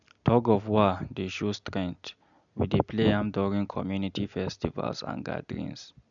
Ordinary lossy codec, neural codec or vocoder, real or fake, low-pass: none; none; real; 7.2 kHz